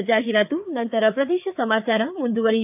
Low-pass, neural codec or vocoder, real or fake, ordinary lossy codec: 3.6 kHz; codec, 16 kHz, 4 kbps, FreqCodec, larger model; fake; AAC, 32 kbps